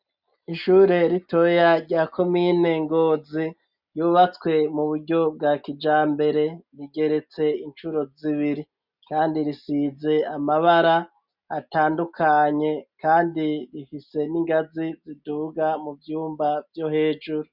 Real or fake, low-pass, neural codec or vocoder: real; 5.4 kHz; none